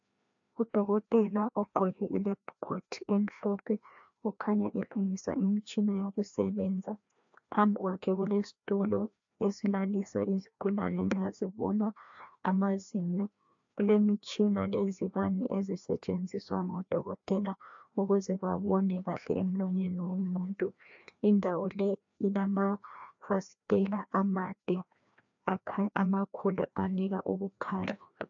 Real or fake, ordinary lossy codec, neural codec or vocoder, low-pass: fake; AAC, 64 kbps; codec, 16 kHz, 1 kbps, FreqCodec, larger model; 7.2 kHz